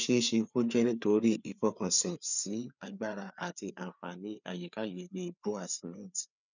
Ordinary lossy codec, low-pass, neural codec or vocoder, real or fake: none; 7.2 kHz; codec, 16 kHz, 4 kbps, FreqCodec, larger model; fake